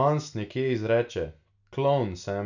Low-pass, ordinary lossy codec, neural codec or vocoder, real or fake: 7.2 kHz; none; none; real